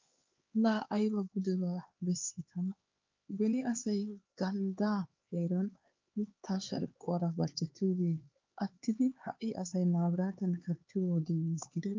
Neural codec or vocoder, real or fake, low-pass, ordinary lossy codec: codec, 16 kHz, 4 kbps, X-Codec, HuBERT features, trained on LibriSpeech; fake; 7.2 kHz; Opus, 24 kbps